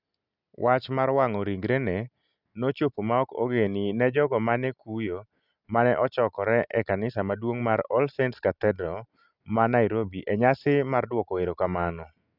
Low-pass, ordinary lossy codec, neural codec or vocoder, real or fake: 5.4 kHz; none; none; real